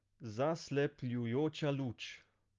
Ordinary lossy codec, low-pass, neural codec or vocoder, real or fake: Opus, 32 kbps; 7.2 kHz; none; real